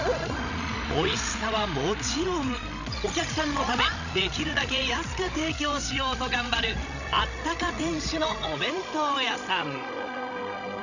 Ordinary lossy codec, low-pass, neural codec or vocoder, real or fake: none; 7.2 kHz; codec, 16 kHz, 16 kbps, FreqCodec, larger model; fake